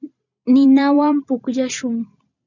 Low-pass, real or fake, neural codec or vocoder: 7.2 kHz; fake; vocoder, 44.1 kHz, 128 mel bands every 256 samples, BigVGAN v2